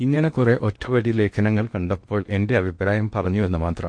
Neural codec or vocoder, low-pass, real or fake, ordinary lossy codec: codec, 16 kHz in and 24 kHz out, 0.8 kbps, FocalCodec, streaming, 65536 codes; 9.9 kHz; fake; MP3, 48 kbps